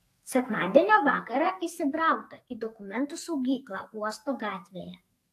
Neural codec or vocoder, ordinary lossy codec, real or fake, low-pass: codec, 44.1 kHz, 2.6 kbps, SNAC; AAC, 64 kbps; fake; 14.4 kHz